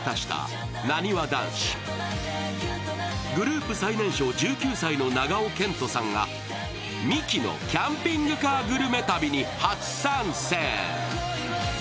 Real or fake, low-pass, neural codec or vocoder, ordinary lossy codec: real; none; none; none